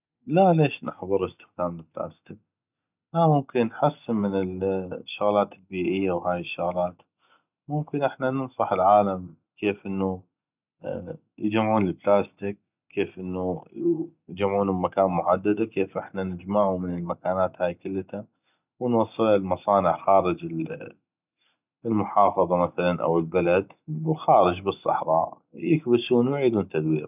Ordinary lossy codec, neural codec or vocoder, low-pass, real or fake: none; none; 3.6 kHz; real